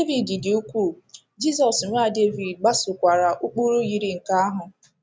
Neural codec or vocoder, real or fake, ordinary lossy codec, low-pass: none; real; none; none